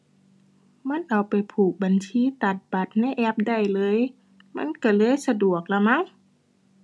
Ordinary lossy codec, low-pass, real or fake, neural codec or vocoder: none; none; real; none